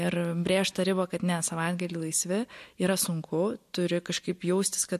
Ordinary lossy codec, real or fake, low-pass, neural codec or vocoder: MP3, 64 kbps; real; 14.4 kHz; none